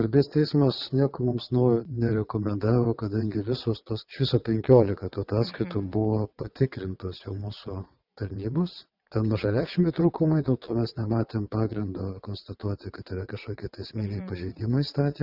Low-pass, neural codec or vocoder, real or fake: 5.4 kHz; vocoder, 22.05 kHz, 80 mel bands, WaveNeXt; fake